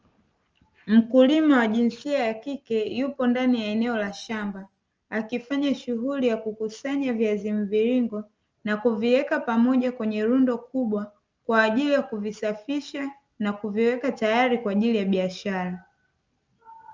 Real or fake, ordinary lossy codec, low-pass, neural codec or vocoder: real; Opus, 24 kbps; 7.2 kHz; none